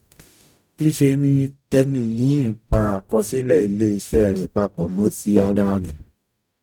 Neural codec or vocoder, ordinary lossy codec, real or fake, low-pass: codec, 44.1 kHz, 0.9 kbps, DAC; none; fake; 19.8 kHz